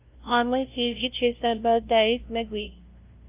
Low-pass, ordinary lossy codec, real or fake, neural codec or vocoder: 3.6 kHz; Opus, 24 kbps; fake; codec, 16 kHz, 0.5 kbps, FunCodec, trained on LibriTTS, 25 frames a second